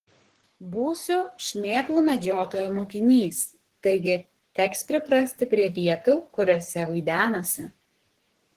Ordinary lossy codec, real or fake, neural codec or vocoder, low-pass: Opus, 16 kbps; fake; codec, 44.1 kHz, 3.4 kbps, Pupu-Codec; 14.4 kHz